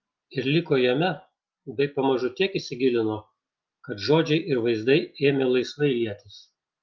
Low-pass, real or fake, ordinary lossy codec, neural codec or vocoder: 7.2 kHz; real; Opus, 32 kbps; none